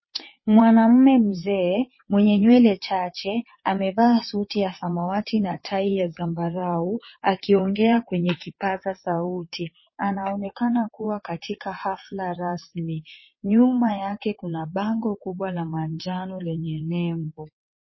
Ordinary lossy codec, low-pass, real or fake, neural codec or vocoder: MP3, 24 kbps; 7.2 kHz; fake; vocoder, 22.05 kHz, 80 mel bands, WaveNeXt